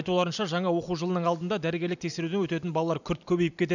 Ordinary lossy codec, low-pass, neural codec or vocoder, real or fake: none; 7.2 kHz; none; real